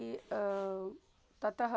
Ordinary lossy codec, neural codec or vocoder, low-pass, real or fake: none; none; none; real